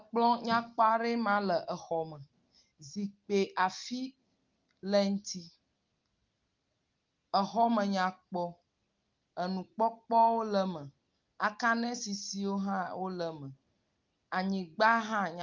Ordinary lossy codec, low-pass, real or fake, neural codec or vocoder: Opus, 32 kbps; 7.2 kHz; real; none